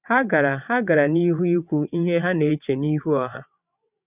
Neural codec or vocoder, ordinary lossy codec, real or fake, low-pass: vocoder, 22.05 kHz, 80 mel bands, WaveNeXt; none; fake; 3.6 kHz